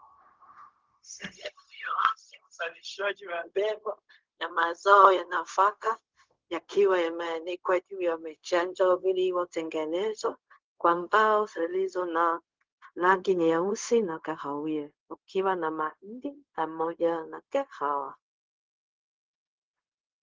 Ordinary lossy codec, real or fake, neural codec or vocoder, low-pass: Opus, 16 kbps; fake; codec, 16 kHz, 0.4 kbps, LongCat-Audio-Codec; 7.2 kHz